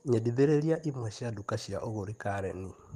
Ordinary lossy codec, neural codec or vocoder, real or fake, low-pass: Opus, 24 kbps; none; real; 14.4 kHz